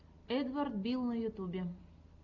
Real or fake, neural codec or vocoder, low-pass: real; none; 7.2 kHz